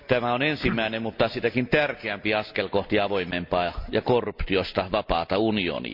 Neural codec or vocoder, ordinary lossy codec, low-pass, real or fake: none; MP3, 48 kbps; 5.4 kHz; real